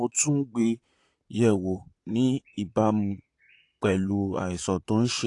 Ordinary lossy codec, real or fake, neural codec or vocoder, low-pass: AAC, 48 kbps; fake; vocoder, 44.1 kHz, 128 mel bands, Pupu-Vocoder; 10.8 kHz